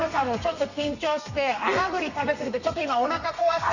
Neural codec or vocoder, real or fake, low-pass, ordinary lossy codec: codec, 32 kHz, 1.9 kbps, SNAC; fake; 7.2 kHz; none